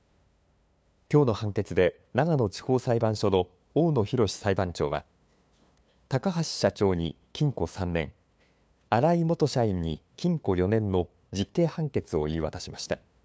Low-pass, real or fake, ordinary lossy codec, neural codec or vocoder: none; fake; none; codec, 16 kHz, 2 kbps, FunCodec, trained on LibriTTS, 25 frames a second